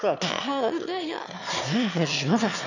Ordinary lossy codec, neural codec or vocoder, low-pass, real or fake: none; autoencoder, 22.05 kHz, a latent of 192 numbers a frame, VITS, trained on one speaker; 7.2 kHz; fake